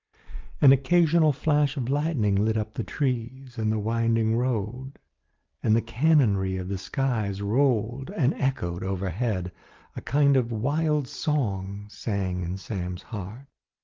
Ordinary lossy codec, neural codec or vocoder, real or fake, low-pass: Opus, 24 kbps; none; real; 7.2 kHz